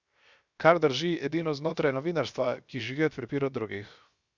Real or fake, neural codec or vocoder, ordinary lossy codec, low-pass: fake; codec, 16 kHz, 0.7 kbps, FocalCodec; Opus, 64 kbps; 7.2 kHz